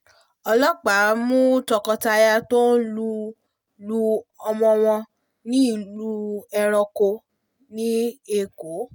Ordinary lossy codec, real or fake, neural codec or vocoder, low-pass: none; real; none; none